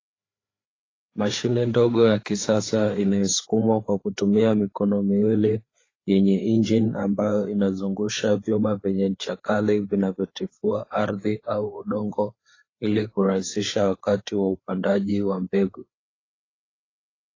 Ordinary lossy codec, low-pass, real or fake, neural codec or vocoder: AAC, 32 kbps; 7.2 kHz; fake; codec, 16 kHz, 4 kbps, FreqCodec, larger model